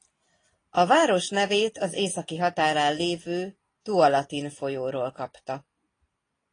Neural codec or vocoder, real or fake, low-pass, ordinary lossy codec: none; real; 9.9 kHz; AAC, 32 kbps